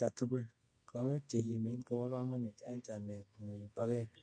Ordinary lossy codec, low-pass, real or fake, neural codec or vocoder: MP3, 48 kbps; 9.9 kHz; fake; codec, 24 kHz, 0.9 kbps, WavTokenizer, medium music audio release